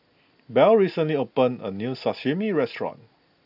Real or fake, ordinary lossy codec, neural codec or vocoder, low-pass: real; AAC, 48 kbps; none; 5.4 kHz